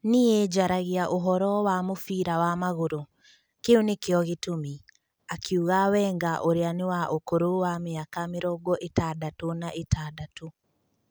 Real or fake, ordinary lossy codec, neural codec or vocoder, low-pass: real; none; none; none